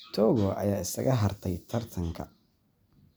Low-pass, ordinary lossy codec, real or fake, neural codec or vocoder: none; none; real; none